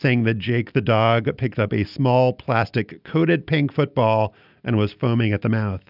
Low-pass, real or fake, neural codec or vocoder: 5.4 kHz; real; none